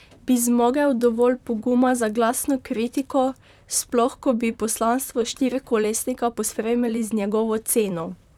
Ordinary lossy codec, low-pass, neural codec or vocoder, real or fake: none; 19.8 kHz; vocoder, 44.1 kHz, 128 mel bands, Pupu-Vocoder; fake